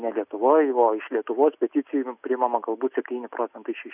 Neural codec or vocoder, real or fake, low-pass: none; real; 3.6 kHz